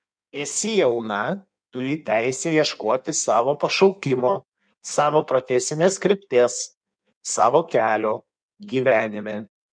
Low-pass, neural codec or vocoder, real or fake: 9.9 kHz; codec, 16 kHz in and 24 kHz out, 1.1 kbps, FireRedTTS-2 codec; fake